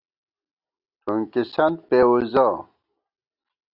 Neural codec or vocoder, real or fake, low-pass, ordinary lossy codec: none; real; 5.4 kHz; Opus, 64 kbps